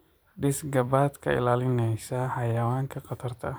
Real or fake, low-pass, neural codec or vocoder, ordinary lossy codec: fake; none; vocoder, 44.1 kHz, 128 mel bands every 512 samples, BigVGAN v2; none